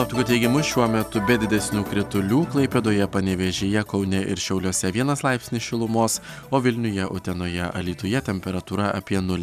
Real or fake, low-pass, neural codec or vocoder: real; 14.4 kHz; none